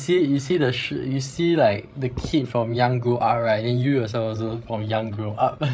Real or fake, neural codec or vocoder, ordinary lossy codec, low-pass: fake; codec, 16 kHz, 16 kbps, FreqCodec, larger model; none; none